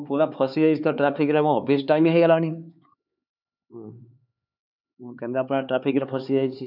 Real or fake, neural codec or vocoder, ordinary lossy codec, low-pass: fake; codec, 16 kHz, 4 kbps, X-Codec, HuBERT features, trained on LibriSpeech; none; 5.4 kHz